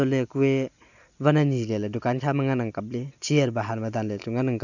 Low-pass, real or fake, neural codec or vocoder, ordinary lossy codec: 7.2 kHz; real; none; none